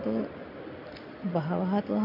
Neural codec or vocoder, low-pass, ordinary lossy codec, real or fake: none; 5.4 kHz; none; real